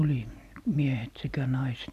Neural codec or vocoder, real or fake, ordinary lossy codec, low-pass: none; real; none; 14.4 kHz